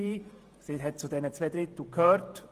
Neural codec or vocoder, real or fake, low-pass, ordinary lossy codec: vocoder, 48 kHz, 128 mel bands, Vocos; fake; 14.4 kHz; Opus, 24 kbps